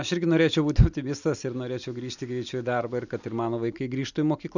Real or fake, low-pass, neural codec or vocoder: real; 7.2 kHz; none